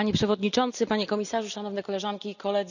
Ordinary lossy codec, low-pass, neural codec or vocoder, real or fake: none; 7.2 kHz; none; real